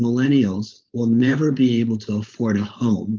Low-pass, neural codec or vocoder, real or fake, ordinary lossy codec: 7.2 kHz; codec, 16 kHz, 4.8 kbps, FACodec; fake; Opus, 32 kbps